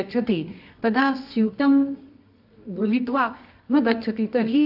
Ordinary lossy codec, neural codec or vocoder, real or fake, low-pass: AAC, 48 kbps; codec, 24 kHz, 0.9 kbps, WavTokenizer, medium music audio release; fake; 5.4 kHz